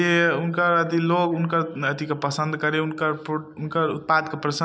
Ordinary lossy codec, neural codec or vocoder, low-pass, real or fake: none; none; none; real